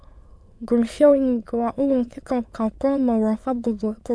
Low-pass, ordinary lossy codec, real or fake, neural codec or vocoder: none; none; fake; autoencoder, 22.05 kHz, a latent of 192 numbers a frame, VITS, trained on many speakers